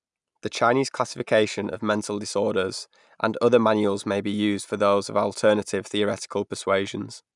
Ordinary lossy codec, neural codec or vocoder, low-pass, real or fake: none; none; 10.8 kHz; real